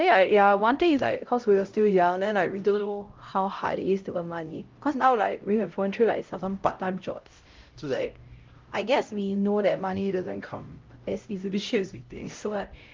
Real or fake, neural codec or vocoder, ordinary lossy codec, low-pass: fake; codec, 16 kHz, 0.5 kbps, X-Codec, HuBERT features, trained on LibriSpeech; Opus, 32 kbps; 7.2 kHz